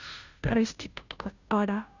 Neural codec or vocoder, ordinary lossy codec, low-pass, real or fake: codec, 16 kHz, 0.5 kbps, FunCodec, trained on Chinese and English, 25 frames a second; none; 7.2 kHz; fake